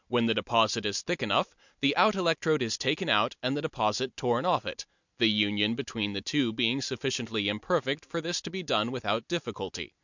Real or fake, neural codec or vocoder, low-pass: real; none; 7.2 kHz